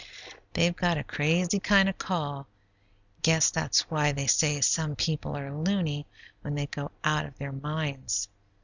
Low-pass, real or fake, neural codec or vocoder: 7.2 kHz; real; none